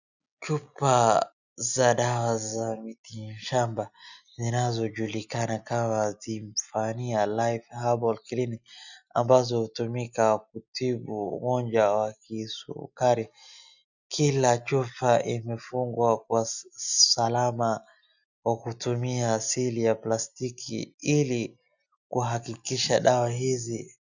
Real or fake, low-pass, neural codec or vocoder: real; 7.2 kHz; none